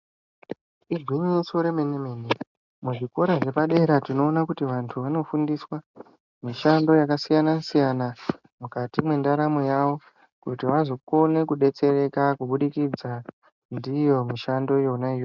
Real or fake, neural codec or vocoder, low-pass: real; none; 7.2 kHz